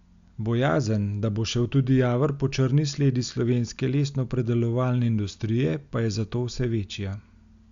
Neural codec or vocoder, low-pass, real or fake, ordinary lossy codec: none; 7.2 kHz; real; Opus, 64 kbps